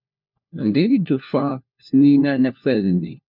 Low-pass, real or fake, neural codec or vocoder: 5.4 kHz; fake; codec, 16 kHz, 1 kbps, FunCodec, trained on LibriTTS, 50 frames a second